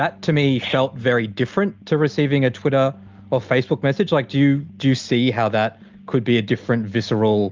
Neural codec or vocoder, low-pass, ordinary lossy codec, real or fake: none; 7.2 kHz; Opus, 32 kbps; real